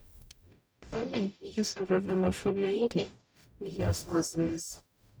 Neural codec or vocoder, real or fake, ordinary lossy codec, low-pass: codec, 44.1 kHz, 0.9 kbps, DAC; fake; none; none